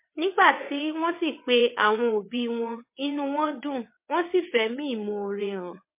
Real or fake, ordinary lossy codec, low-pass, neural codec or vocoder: fake; MP3, 24 kbps; 3.6 kHz; vocoder, 22.05 kHz, 80 mel bands, WaveNeXt